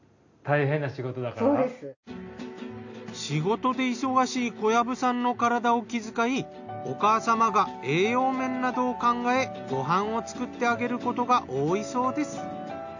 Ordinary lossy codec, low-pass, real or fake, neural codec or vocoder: none; 7.2 kHz; real; none